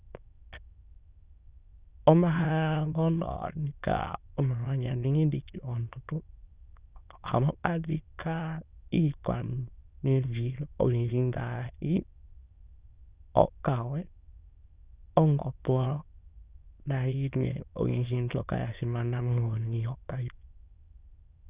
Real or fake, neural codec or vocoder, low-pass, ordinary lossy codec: fake; autoencoder, 22.05 kHz, a latent of 192 numbers a frame, VITS, trained on many speakers; 3.6 kHz; Opus, 64 kbps